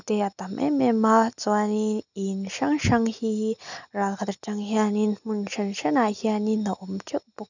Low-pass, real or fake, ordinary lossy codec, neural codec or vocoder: 7.2 kHz; real; none; none